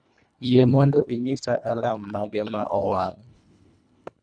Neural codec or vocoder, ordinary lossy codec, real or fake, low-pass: codec, 24 kHz, 1.5 kbps, HILCodec; none; fake; 9.9 kHz